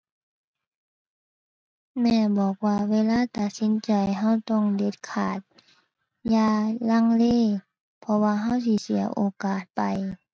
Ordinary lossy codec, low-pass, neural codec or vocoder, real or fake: none; none; none; real